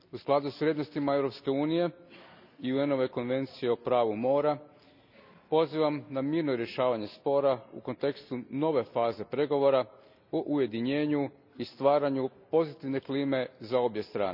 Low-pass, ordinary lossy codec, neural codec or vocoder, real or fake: 5.4 kHz; none; none; real